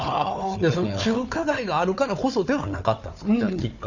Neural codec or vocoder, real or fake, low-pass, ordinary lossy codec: codec, 16 kHz, 4 kbps, FunCodec, trained on Chinese and English, 50 frames a second; fake; 7.2 kHz; none